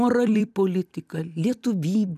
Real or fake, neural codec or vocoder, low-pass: fake; vocoder, 44.1 kHz, 128 mel bands every 256 samples, BigVGAN v2; 14.4 kHz